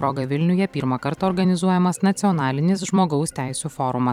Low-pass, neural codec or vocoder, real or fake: 19.8 kHz; vocoder, 44.1 kHz, 128 mel bands every 256 samples, BigVGAN v2; fake